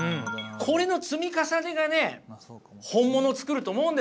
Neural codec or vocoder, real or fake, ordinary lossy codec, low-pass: none; real; none; none